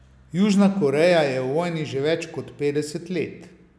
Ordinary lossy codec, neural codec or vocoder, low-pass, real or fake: none; none; none; real